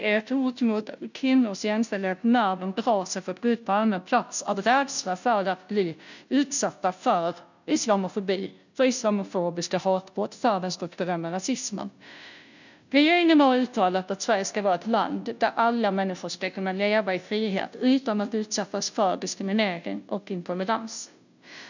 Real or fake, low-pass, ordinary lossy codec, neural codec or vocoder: fake; 7.2 kHz; none; codec, 16 kHz, 0.5 kbps, FunCodec, trained on Chinese and English, 25 frames a second